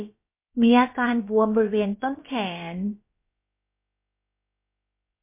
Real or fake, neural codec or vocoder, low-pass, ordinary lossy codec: fake; codec, 16 kHz, about 1 kbps, DyCAST, with the encoder's durations; 3.6 kHz; MP3, 24 kbps